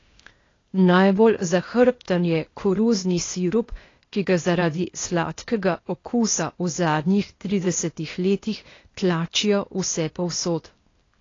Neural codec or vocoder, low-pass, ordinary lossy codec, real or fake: codec, 16 kHz, 0.8 kbps, ZipCodec; 7.2 kHz; AAC, 32 kbps; fake